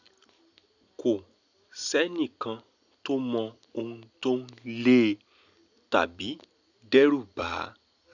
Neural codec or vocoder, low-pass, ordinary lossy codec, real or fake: none; 7.2 kHz; none; real